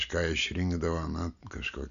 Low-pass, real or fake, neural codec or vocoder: 7.2 kHz; real; none